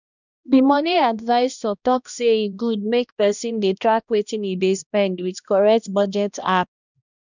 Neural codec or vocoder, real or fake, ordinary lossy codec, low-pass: codec, 16 kHz, 1 kbps, X-Codec, HuBERT features, trained on balanced general audio; fake; none; 7.2 kHz